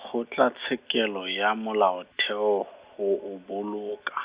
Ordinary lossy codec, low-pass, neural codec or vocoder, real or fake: Opus, 64 kbps; 3.6 kHz; none; real